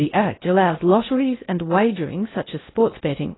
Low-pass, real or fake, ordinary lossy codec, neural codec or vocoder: 7.2 kHz; fake; AAC, 16 kbps; codec, 16 kHz in and 24 kHz out, 0.6 kbps, FocalCodec, streaming, 4096 codes